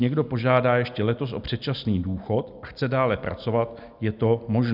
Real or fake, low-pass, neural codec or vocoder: real; 5.4 kHz; none